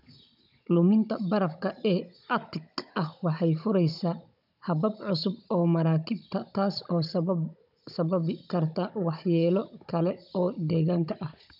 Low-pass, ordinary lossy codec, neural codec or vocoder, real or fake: 5.4 kHz; none; codec, 16 kHz, 16 kbps, FunCodec, trained on Chinese and English, 50 frames a second; fake